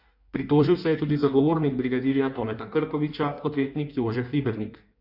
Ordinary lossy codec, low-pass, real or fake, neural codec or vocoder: none; 5.4 kHz; fake; codec, 16 kHz in and 24 kHz out, 1.1 kbps, FireRedTTS-2 codec